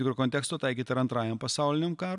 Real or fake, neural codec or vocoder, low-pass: real; none; 10.8 kHz